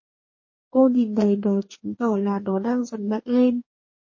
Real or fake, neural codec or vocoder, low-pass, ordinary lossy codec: fake; codec, 44.1 kHz, 2.6 kbps, DAC; 7.2 kHz; MP3, 32 kbps